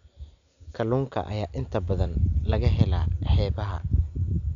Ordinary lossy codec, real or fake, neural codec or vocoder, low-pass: none; real; none; 7.2 kHz